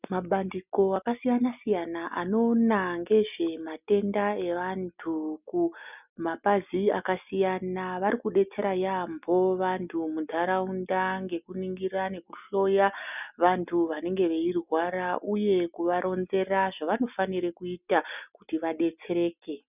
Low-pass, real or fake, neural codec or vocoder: 3.6 kHz; real; none